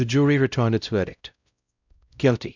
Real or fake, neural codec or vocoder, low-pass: fake; codec, 16 kHz, 0.5 kbps, X-Codec, WavLM features, trained on Multilingual LibriSpeech; 7.2 kHz